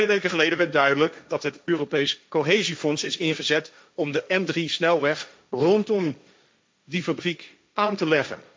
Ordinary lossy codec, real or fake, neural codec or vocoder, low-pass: none; fake; codec, 16 kHz, 1.1 kbps, Voila-Tokenizer; none